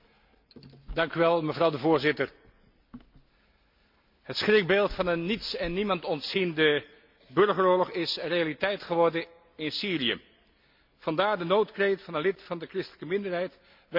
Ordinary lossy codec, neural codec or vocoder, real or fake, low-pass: MP3, 48 kbps; none; real; 5.4 kHz